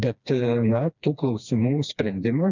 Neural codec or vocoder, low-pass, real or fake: codec, 16 kHz, 2 kbps, FreqCodec, smaller model; 7.2 kHz; fake